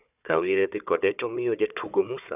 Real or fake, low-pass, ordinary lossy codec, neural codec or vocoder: fake; 3.6 kHz; none; codec, 16 kHz, 4 kbps, FunCodec, trained on Chinese and English, 50 frames a second